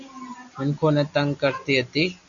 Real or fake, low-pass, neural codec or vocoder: real; 7.2 kHz; none